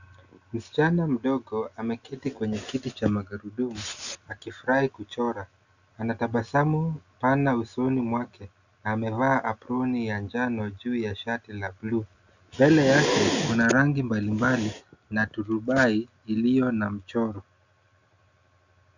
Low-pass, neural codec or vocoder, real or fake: 7.2 kHz; none; real